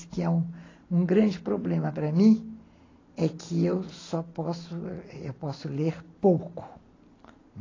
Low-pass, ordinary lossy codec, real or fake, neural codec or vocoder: 7.2 kHz; AAC, 32 kbps; real; none